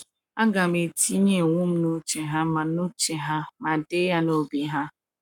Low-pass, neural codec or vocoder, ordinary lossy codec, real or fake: 19.8 kHz; codec, 44.1 kHz, 7.8 kbps, Pupu-Codec; none; fake